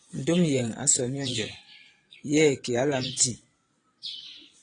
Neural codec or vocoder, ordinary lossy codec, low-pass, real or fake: vocoder, 22.05 kHz, 80 mel bands, Vocos; AAC, 32 kbps; 9.9 kHz; fake